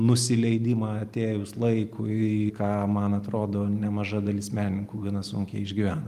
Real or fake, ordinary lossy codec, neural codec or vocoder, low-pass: real; Opus, 32 kbps; none; 14.4 kHz